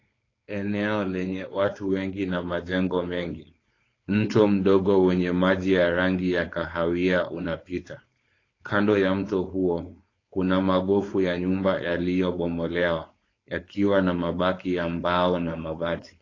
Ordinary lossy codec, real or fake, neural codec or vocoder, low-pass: AAC, 32 kbps; fake; codec, 16 kHz, 4.8 kbps, FACodec; 7.2 kHz